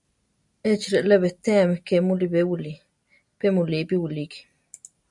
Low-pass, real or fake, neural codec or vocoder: 10.8 kHz; real; none